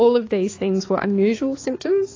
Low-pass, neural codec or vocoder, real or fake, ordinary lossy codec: 7.2 kHz; codec, 16 kHz, 4 kbps, X-Codec, HuBERT features, trained on balanced general audio; fake; AAC, 32 kbps